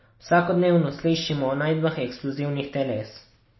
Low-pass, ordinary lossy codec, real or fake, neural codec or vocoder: 7.2 kHz; MP3, 24 kbps; real; none